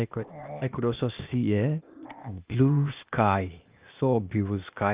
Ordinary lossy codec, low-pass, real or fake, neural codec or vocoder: Opus, 24 kbps; 3.6 kHz; fake; codec, 16 kHz, 0.8 kbps, ZipCodec